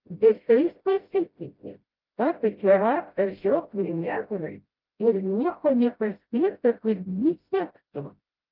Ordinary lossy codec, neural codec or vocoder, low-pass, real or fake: Opus, 32 kbps; codec, 16 kHz, 0.5 kbps, FreqCodec, smaller model; 5.4 kHz; fake